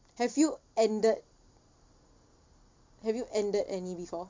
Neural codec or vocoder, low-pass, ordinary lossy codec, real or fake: none; 7.2 kHz; MP3, 48 kbps; real